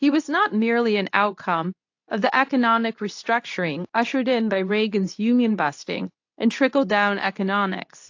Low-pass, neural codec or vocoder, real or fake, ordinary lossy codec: 7.2 kHz; codec, 24 kHz, 0.9 kbps, WavTokenizer, medium speech release version 2; fake; AAC, 48 kbps